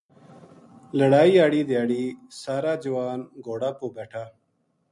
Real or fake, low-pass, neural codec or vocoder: real; 10.8 kHz; none